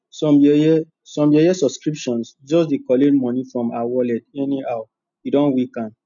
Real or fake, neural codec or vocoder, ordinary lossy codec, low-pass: real; none; none; 7.2 kHz